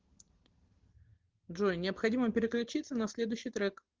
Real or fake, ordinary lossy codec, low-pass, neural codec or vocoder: real; Opus, 16 kbps; 7.2 kHz; none